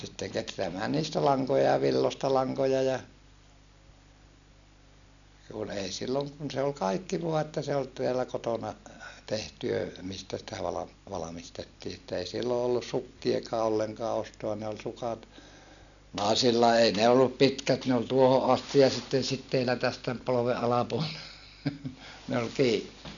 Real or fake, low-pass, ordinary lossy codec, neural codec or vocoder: real; 7.2 kHz; none; none